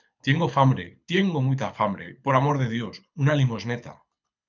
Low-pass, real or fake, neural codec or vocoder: 7.2 kHz; fake; codec, 24 kHz, 6 kbps, HILCodec